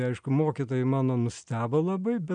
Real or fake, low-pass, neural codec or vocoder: real; 9.9 kHz; none